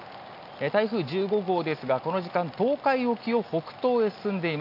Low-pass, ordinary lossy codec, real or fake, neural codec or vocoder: 5.4 kHz; none; real; none